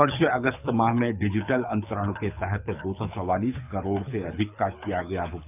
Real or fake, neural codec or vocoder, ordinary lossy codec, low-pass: fake; codec, 24 kHz, 6 kbps, HILCodec; none; 3.6 kHz